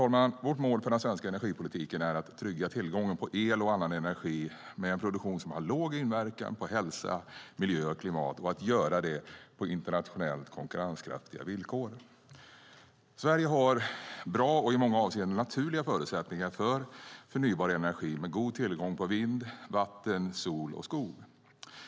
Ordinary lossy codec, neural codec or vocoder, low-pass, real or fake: none; none; none; real